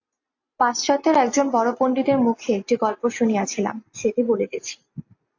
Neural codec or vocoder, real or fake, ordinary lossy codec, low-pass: none; real; AAC, 48 kbps; 7.2 kHz